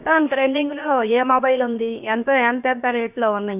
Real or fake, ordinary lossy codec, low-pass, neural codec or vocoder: fake; none; 3.6 kHz; codec, 16 kHz, 0.7 kbps, FocalCodec